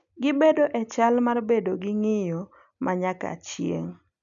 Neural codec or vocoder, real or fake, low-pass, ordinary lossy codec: none; real; 7.2 kHz; none